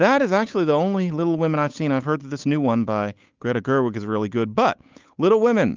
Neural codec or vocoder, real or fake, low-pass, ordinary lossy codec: codec, 16 kHz, 8 kbps, FunCodec, trained on Chinese and English, 25 frames a second; fake; 7.2 kHz; Opus, 24 kbps